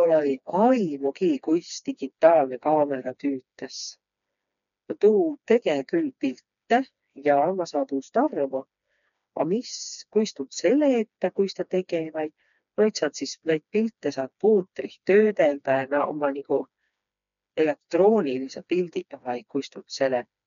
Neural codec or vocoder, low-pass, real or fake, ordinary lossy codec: codec, 16 kHz, 2 kbps, FreqCodec, smaller model; 7.2 kHz; fake; none